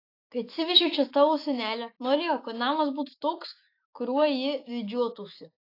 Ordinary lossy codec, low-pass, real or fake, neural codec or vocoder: AAC, 32 kbps; 5.4 kHz; fake; autoencoder, 48 kHz, 128 numbers a frame, DAC-VAE, trained on Japanese speech